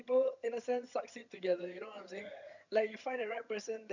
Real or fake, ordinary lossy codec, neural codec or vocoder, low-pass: fake; none; vocoder, 22.05 kHz, 80 mel bands, HiFi-GAN; 7.2 kHz